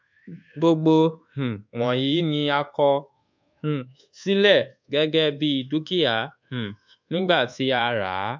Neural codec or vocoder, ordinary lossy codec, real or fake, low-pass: codec, 24 kHz, 1.2 kbps, DualCodec; MP3, 64 kbps; fake; 7.2 kHz